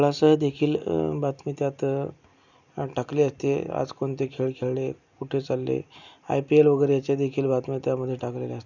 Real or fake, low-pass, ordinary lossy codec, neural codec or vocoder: real; 7.2 kHz; none; none